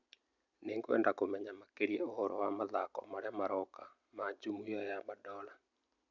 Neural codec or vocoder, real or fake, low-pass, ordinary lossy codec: none; real; 7.2 kHz; none